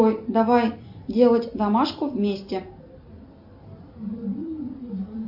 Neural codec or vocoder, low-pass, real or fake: none; 5.4 kHz; real